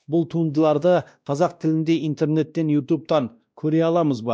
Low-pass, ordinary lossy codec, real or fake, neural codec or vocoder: none; none; fake; codec, 16 kHz, 1 kbps, X-Codec, WavLM features, trained on Multilingual LibriSpeech